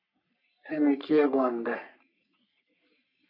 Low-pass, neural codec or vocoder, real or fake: 5.4 kHz; codec, 44.1 kHz, 3.4 kbps, Pupu-Codec; fake